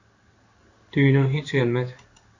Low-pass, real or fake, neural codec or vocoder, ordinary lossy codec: 7.2 kHz; fake; codec, 16 kHz in and 24 kHz out, 1 kbps, XY-Tokenizer; Opus, 64 kbps